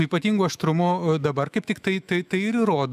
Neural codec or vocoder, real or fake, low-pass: autoencoder, 48 kHz, 128 numbers a frame, DAC-VAE, trained on Japanese speech; fake; 14.4 kHz